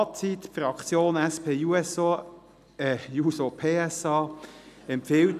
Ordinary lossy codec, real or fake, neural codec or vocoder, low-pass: none; real; none; 14.4 kHz